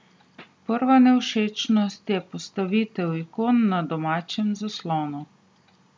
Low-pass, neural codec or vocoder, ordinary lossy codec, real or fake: none; none; none; real